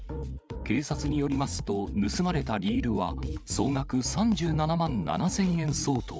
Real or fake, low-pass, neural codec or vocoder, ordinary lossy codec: fake; none; codec, 16 kHz, 8 kbps, FreqCodec, larger model; none